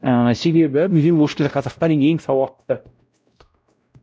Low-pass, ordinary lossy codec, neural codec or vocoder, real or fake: none; none; codec, 16 kHz, 0.5 kbps, X-Codec, WavLM features, trained on Multilingual LibriSpeech; fake